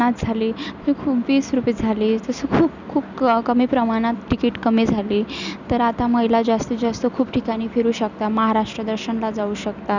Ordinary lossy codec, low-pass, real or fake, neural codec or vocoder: none; 7.2 kHz; real; none